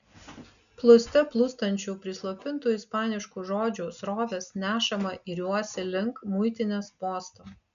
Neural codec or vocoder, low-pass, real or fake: none; 7.2 kHz; real